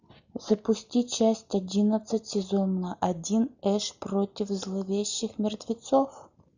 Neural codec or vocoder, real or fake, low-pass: none; real; 7.2 kHz